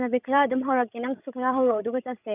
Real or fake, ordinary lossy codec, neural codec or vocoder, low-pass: fake; none; codec, 16 kHz, 16 kbps, FreqCodec, larger model; 3.6 kHz